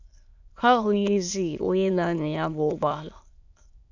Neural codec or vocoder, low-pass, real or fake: autoencoder, 22.05 kHz, a latent of 192 numbers a frame, VITS, trained on many speakers; 7.2 kHz; fake